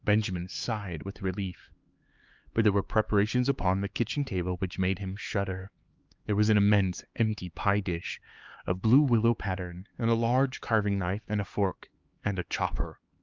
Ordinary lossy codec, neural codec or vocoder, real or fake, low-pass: Opus, 32 kbps; codec, 16 kHz, 4 kbps, X-Codec, HuBERT features, trained on LibriSpeech; fake; 7.2 kHz